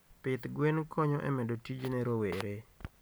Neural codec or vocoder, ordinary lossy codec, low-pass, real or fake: none; none; none; real